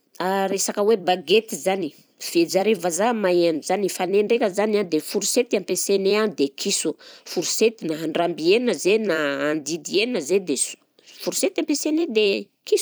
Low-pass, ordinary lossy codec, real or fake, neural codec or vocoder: none; none; fake; vocoder, 44.1 kHz, 128 mel bands every 256 samples, BigVGAN v2